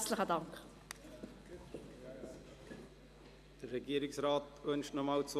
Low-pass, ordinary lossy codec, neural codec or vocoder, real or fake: 14.4 kHz; none; none; real